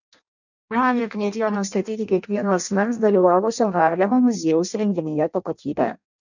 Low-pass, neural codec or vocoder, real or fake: 7.2 kHz; codec, 16 kHz in and 24 kHz out, 0.6 kbps, FireRedTTS-2 codec; fake